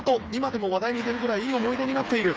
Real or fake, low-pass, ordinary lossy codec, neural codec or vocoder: fake; none; none; codec, 16 kHz, 4 kbps, FreqCodec, smaller model